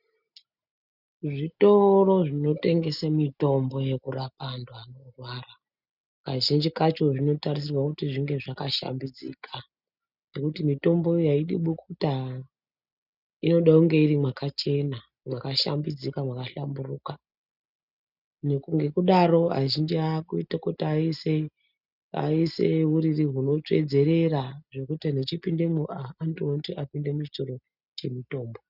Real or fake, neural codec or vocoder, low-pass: real; none; 5.4 kHz